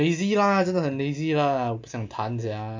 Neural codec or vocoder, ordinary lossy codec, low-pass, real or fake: none; MP3, 48 kbps; 7.2 kHz; real